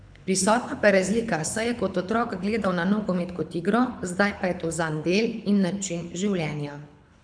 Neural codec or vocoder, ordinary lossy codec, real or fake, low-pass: codec, 24 kHz, 6 kbps, HILCodec; none; fake; 9.9 kHz